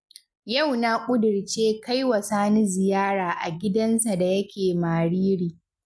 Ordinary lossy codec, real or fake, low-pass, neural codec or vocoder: none; real; none; none